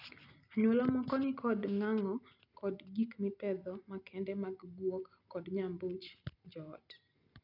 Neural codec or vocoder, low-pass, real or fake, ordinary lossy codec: none; 5.4 kHz; real; none